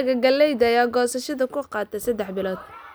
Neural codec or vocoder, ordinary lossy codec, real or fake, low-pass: none; none; real; none